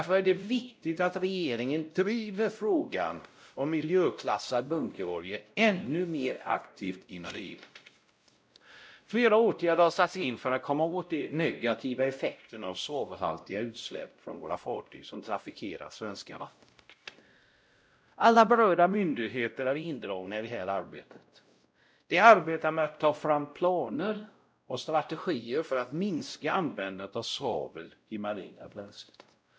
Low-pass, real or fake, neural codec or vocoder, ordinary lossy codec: none; fake; codec, 16 kHz, 0.5 kbps, X-Codec, WavLM features, trained on Multilingual LibriSpeech; none